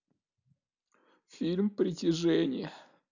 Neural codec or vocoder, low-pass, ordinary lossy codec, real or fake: none; 7.2 kHz; none; real